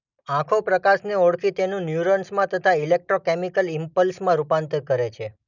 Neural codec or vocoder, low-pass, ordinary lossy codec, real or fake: none; 7.2 kHz; none; real